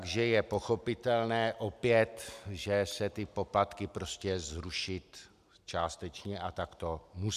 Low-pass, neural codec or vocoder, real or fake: 14.4 kHz; none; real